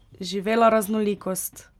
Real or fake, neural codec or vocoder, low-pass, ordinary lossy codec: real; none; 19.8 kHz; none